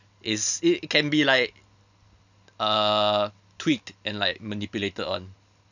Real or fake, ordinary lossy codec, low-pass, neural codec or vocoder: real; none; 7.2 kHz; none